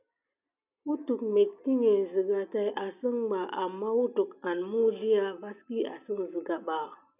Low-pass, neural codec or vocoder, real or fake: 3.6 kHz; none; real